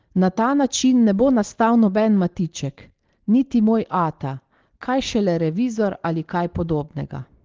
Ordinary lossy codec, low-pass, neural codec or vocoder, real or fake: Opus, 16 kbps; 7.2 kHz; none; real